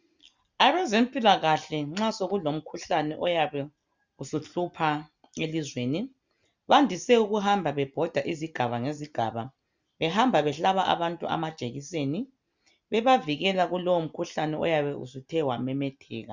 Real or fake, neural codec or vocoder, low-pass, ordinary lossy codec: real; none; 7.2 kHz; Opus, 64 kbps